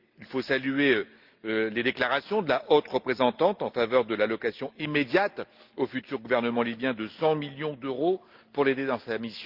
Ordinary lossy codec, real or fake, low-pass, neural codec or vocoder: Opus, 32 kbps; real; 5.4 kHz; none